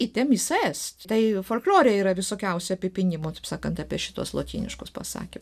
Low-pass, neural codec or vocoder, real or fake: 14.4 kHz; none; real